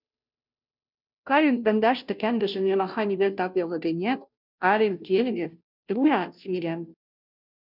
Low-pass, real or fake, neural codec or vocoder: 5.4 kHz; fake; codec, 16 kHz, 0.5 kbps, FunCodec, trained on Chinese and English, 25 frames a second